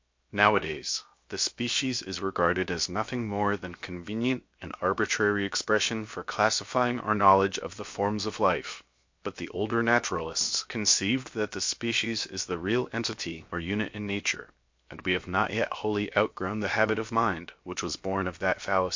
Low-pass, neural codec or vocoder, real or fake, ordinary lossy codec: 7.2 kHz; codec, 16 kHz, 0.7 kbps, FocalCodec; fake; MP3, 48 kbps